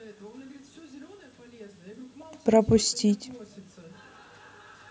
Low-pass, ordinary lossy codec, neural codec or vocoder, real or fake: none; none; none; real